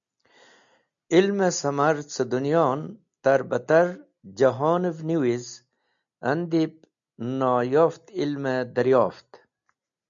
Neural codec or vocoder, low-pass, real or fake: none; 7.2 kHz; real